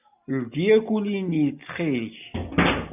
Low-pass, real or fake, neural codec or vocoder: 3.6 kHz; real; none